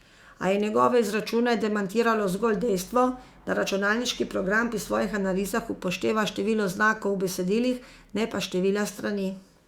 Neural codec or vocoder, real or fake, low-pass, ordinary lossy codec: autoencoder, 48 kHz, 128 numbers a frame, DAC-VAE, trained on Japanese speech; fake; 19.8 kHz; Opus, 64 kbps